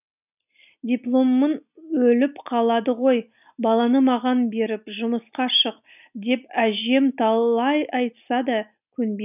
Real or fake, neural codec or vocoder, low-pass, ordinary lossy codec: real; none; 3.6 kHz; none